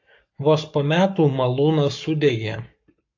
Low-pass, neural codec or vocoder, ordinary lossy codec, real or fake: 7.2 kHz; codec, 44.1 kHz, 7.8 kbps, Pupu-Codec; AAC, 48 kbps; fake